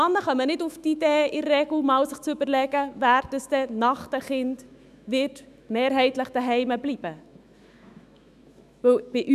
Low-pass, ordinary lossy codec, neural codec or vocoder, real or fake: 14.4 kHz; none; autoencoder, 48 kHz, 128 numbers a frame, DAC-VAE, trained on Japanese speech; fake